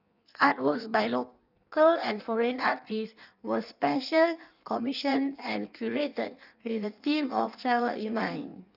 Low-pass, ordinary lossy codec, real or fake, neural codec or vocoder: 5.4 kHz; none; fake; codec, 16 kHz in and 24 kHz out, 1.1 kbps, FireRedTTS-2 codec